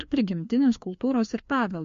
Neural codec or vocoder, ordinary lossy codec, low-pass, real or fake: codec, 16 kHz, 2 kbps, FreqCodec, larger model; MP3, 48 kbps; 7.2 kHz; fake